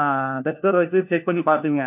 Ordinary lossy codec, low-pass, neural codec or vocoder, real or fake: none; 3.6 kHz; codec, 16 kHz, 1 kbps, FunCodec, trained on LibriTTS, 50 frames a second; fake